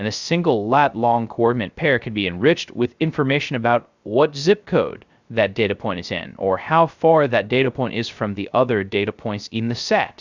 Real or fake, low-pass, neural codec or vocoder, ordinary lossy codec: fake; 7.2 kHz; codec, 16 kHz, 0.3 kbps, FocalCodec; Opus, 64 kbps